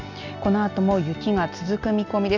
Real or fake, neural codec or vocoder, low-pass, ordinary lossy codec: real; none; 7.2 kHz; none